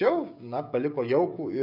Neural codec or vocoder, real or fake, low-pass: codec, 16 kHz, 16 kbps, FreqCodec, smaller model; fake; 5.4 kHz